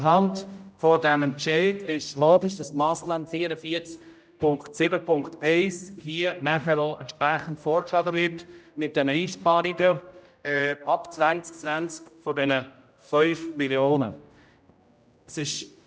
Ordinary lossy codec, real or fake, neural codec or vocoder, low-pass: none; fake; codec, 16 kHz, 0.5 kbps, X-Codec, HuBERT features, trained on general audio; none